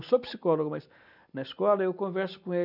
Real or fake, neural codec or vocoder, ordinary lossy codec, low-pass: real; none; none; 5.4 kHz